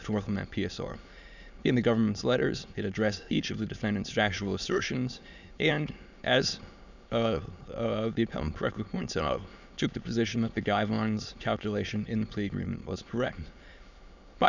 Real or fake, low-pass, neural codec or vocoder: fake; 7.2 kHz; autoencoder, 22.05 kHz, a latent of 192 numbers a frame, VITS, trained on many speakers